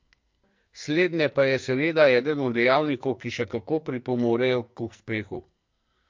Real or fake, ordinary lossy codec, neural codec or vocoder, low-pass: fake; MP3, 48 kbps; codec, 44.1 kHz, 2.6 kbps, SNAC; 7.2 kHz